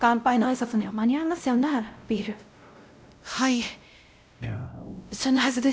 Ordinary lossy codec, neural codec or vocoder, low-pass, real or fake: none; codec, 16 kHz, 0.5 kbps, X-Codec, WavLM features, trained on Multilingual LibriSpeech; none; fake